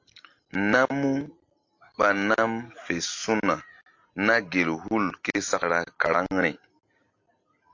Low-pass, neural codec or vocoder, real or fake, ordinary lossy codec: 7.2 kHz; none; real; AAC, 48 kbps